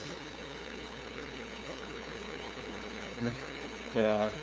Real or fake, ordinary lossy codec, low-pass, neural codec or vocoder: fake; none; none; codec, 16 kHz, 2 kbps, FunCodec, trained on LibriTTS, 25 frames a second